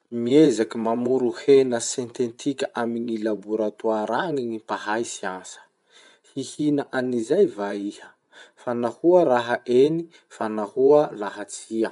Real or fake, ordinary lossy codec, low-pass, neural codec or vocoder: fake; none; 10.8 kHz; vocoder, 24 kHz, 100 mel bands, Vocos